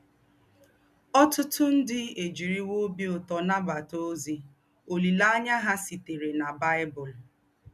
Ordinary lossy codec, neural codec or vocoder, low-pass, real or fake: none; none; 14.4 kHz; real